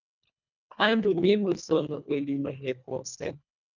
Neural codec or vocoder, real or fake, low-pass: codec, 24 kHz, 1.5 kbps, HILCodec; fake; 7.2 kHz